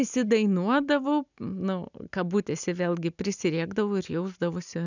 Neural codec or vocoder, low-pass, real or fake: none; 7.2 kHz; real